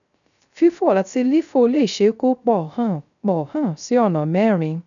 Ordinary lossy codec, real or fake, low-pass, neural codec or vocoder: none; fake; 7.2 kHz; codec, 16 kHz, 0.3 kbps, FocalCodec